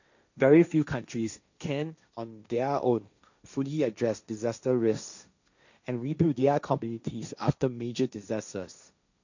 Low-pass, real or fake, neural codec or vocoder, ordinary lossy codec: 7.2 kHz; fake; codec, 16 kHz, 1.1 kbps, Voila-Tokenizer; none